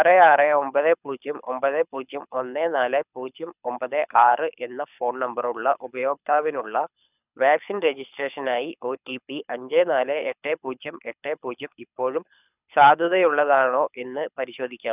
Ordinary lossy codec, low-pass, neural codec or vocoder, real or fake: none; 3.6 kHz; codec, 24 kHz, 6 kbps, HILCodec; fake